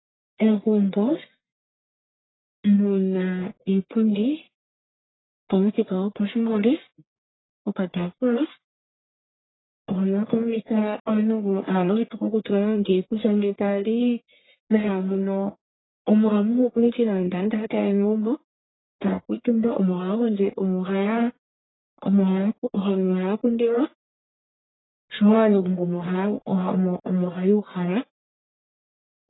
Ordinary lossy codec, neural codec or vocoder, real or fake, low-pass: AAC, 16 kbps; codec, 44.1 kHz, 1.7 kbps, Pupu-Codec; fake; 7.2 kHz